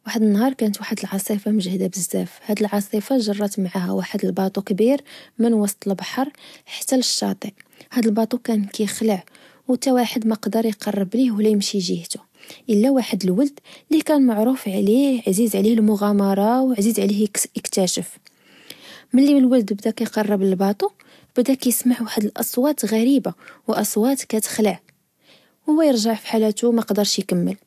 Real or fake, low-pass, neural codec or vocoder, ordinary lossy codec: real; 14.4 kHz; none; AAC, 96 kbps